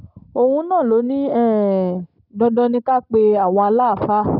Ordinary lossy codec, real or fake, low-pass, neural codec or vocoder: none; real; 5.4 kHz; none